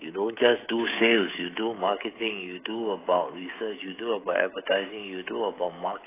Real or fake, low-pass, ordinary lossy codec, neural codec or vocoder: fake; 3.6 kHz; AAC, 16 kbps; codec, 16 kHz, 16 kbps, FreqCodec, smaller model